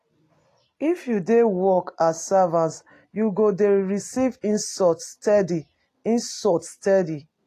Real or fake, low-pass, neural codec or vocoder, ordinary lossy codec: real; 14.4 kHz; none; AAC, 48 kbps